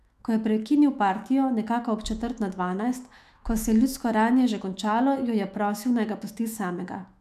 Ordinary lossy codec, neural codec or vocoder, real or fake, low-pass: none; autoencoder, 48 kHz, 128 numbers a frame, DAC-VAE, trained on Japanese speech; fake; 14.4 kHz